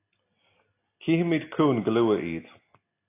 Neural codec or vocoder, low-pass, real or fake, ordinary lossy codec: none; 3.6 kHz; real; AAC, 24 kbps